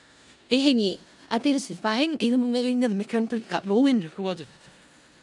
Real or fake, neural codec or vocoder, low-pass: fake; codec, 16 kHz in and 24 kHz out, 0.4 kbps, LongCat-Audio-Codec, four codebook decoder; 10.8 kHz